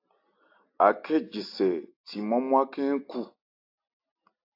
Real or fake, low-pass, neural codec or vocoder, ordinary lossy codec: real; 5.4 kHz; none; AAC, 32 kbps